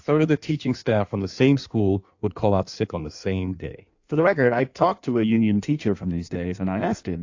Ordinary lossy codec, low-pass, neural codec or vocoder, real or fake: AAC, 48 kbps; 7.2 kHz; codec, 16 kHz in and 24 kHz out, 1.1 kbps, FireRedTTS-2 codec; fake